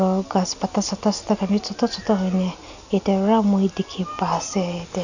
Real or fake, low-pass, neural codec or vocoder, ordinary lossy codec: real; 7.2 kHz; none; none